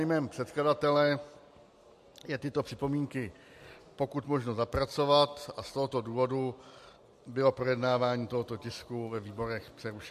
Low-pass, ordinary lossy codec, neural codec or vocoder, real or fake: 14.4 kHz; MP3, 64 kbps; none; real